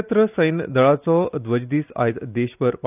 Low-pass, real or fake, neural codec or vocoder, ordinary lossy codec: 3.6 kHz; real; none; none